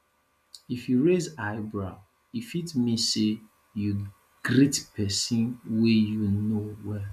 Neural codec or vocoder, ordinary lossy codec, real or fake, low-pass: none; none; real; 14.4 kHz